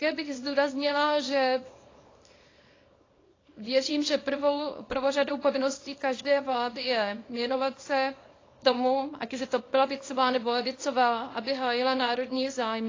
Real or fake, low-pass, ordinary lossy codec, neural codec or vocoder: fake; 7.2 kHz; AAC, 32 kbps; codec, 24 kHz, 0.9 kbps, WavTokenizer, small release